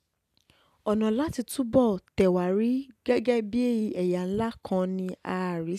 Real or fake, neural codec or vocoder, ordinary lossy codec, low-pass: real; none; none; 14.4 kHz